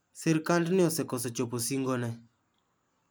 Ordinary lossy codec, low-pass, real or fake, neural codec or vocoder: none; none; real; none